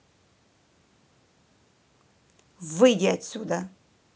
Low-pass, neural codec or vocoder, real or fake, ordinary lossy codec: none; none; real; none